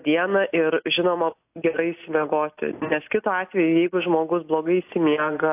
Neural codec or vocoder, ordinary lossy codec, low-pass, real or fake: none; AAC, 32 kbps; 3.6 kHz; real